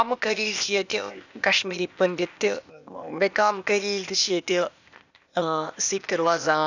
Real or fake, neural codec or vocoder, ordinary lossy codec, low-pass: fake; codec, 16 kHz, 0.8 kbps, ZipCodec; none; 7.2 kHz